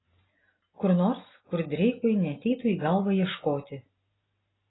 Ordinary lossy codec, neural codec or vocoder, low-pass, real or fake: AAC, 16 kbps; none; 7.2 kHz; real